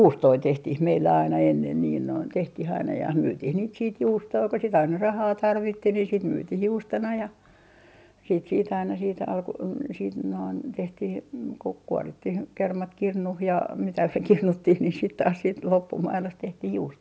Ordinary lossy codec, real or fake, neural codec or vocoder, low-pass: none; real; none; none